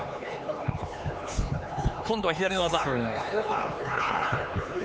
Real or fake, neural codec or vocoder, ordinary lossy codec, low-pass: fake; codec, 16 kHz, 4 kbps, X-Codec, HuBERT features, trained on LibriSpeech; none; none